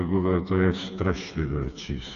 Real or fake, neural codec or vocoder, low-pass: fake; codec, 16 kHz, 4 kbps, FreqCodec, smaller model; 7.2 kHz